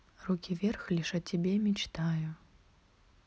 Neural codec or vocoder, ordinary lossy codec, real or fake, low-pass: none; none; real; none